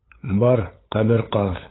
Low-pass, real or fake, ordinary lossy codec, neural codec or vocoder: 7.2 kHz; fake; AAC, 16 kbps; codec, 16 kHz, 8 kbps, FunCodec, trained on LibriTTS, 25 frames a second